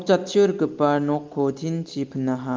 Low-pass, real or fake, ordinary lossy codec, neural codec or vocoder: 7.2 kHz; real; Opus, 32 kbps; none